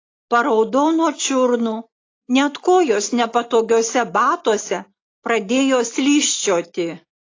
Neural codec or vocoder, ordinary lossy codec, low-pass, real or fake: none; AAC, 32 kbps; 7.2 kHz; real